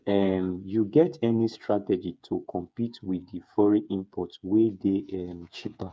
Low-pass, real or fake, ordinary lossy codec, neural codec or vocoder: none; fake; none; codec, 16 kHz, 8 kbps, FreqCodec, smaller model